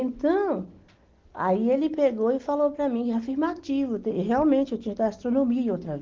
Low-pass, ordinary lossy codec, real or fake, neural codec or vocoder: 7.2 kHz; Opus, 16 kbps; real; none